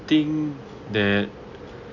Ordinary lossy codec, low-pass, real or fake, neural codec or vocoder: none; 7.2 kHz; real; none